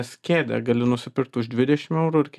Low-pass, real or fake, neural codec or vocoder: 14.4 kHz; real; none